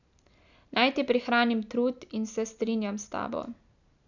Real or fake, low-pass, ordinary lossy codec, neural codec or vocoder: real; 7.2 kHz; none; none